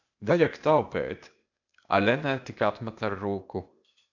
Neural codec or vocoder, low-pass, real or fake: codec, 16 kHz, 0.8 kbps, ZipCodec; 7.2 kHz; fake